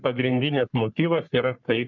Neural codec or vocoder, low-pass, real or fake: codec, 44.1 kHz, 3.4 kbps, Pupu-Codec; 7.2 kHz; fake